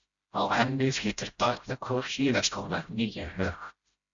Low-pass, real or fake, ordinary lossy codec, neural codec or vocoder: 7.2 kHz; fake; Opus, 64 kbps; codec, 16 kHz, 0.5 kbps, FreqCodec, smaller model